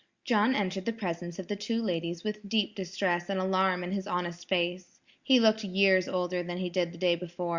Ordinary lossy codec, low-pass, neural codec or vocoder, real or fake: Opus, 64 kbps; 7.2 kHz; none; real